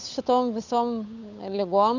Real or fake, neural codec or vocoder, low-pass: fake; codec, 16 kHz, 8 kbps, FunCodec, trained on Chinese and English, 25 frames a second; 7.2 kHz